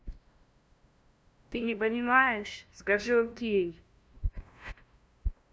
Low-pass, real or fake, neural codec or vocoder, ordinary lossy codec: none; fake; codec, 16 kHz, 0.5 kbps, FunCodec, trained on LibriTTS, 25 frames a second; none